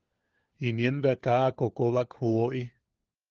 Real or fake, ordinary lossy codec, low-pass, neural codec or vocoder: fake; Opus, 16 kbps; 7.2 kHz; codec, 16 kHz, 4 kbps, FunCodec, trained on LibriTTS, 50 frames a second